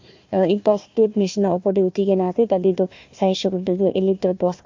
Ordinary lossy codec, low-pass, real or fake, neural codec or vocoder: MP3, 48 kbps; 7.2 kHz; fake; codec, 16 kHz, 1 kbps, FunCodec, trained on Chinese and English, 50 frames a second